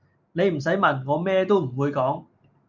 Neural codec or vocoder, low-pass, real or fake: none; 7.2 kHz; real